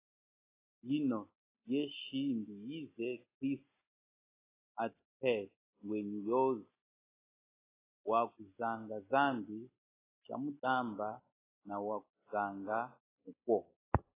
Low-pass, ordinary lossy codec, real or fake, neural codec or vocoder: 3.6 kHz; AAC, 16 kbps; real; none